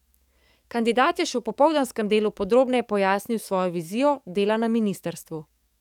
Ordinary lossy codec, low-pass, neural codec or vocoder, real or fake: none; 19.8 kHz; codec, 44.1 kHz, 7.8 kbps, DAC; fake